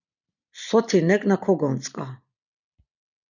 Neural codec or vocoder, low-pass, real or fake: none; 7.2 kHz; real